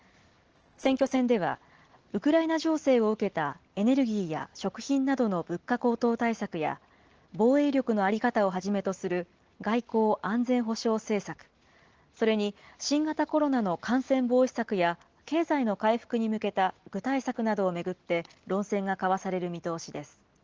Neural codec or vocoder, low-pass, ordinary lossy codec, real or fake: none; 7.2 kHz; Opus, 16 kbps; real